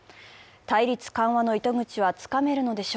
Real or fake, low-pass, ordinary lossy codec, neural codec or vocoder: real; none; none; none